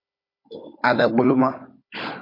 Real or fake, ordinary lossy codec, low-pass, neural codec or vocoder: fake; MP3, 32 kbps; 5.4 kHz; codec, 16 kHz, 16 kbps, FunCodec, trained on Chinese and English, 50 frames a second